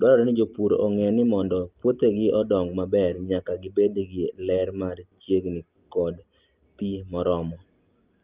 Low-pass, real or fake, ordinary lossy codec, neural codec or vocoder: 3.6 kHz; real; Opus, 24 kbps; none